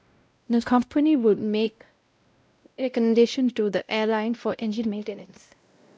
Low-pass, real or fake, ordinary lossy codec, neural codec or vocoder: none; fake; none; codec, 16 kHz, 0.5 kbps, X-Codec, WavLM features, trained on Multilingual LibriSpeech